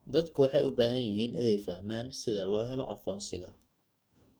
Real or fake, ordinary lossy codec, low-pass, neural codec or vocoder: fake; none; none; codec, 44.1 kHz, 2.6 kbps, DAC